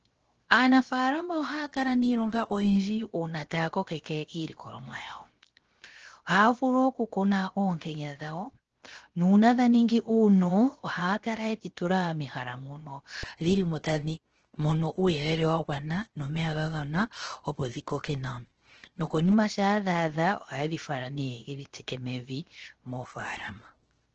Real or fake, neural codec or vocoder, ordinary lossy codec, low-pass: fake; codec, 16 kHz, 0.7 kbps, FocalCodec; Opus, 16 kbps; 7.2 kHz